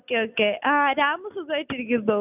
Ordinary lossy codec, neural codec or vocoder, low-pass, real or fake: none; none; 3.6 kHz; real